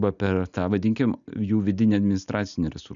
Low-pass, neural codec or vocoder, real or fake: 7.2 kHz; none; real